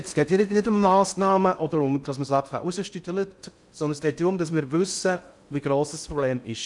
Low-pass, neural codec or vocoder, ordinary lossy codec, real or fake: 10.8 kHz; codec, 16 kHz in and 24 kHz out, 0.6 kbps, FocalCodec, streaming, 4096 codes; none; fake